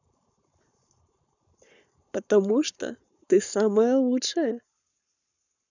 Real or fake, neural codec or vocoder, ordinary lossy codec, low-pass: fake; codec, 44.1 kHz, 7.8 kbps, Pupu-Codec; none; 7.2 kHz